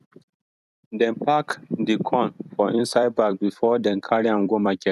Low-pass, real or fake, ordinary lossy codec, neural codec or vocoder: 14.4 kHz; fake; none; vocoder, 44.1 kHz, 128 mel bands every 256 samples, BigVGAN v2